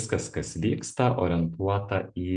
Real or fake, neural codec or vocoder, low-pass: real; none; 9.9 kHz